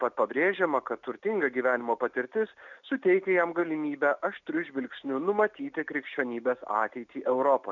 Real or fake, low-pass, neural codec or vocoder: real; 7.2 kHz; none